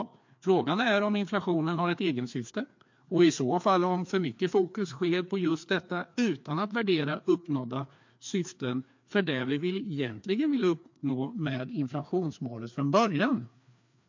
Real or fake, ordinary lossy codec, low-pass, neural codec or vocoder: fake; MP3, 48 kbps; 7.2 kHz; codec, 16 kHz, 2 kbps, FreqCodec, larger model